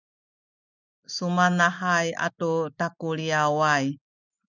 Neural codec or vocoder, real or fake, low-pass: none; real; 7.2 kHz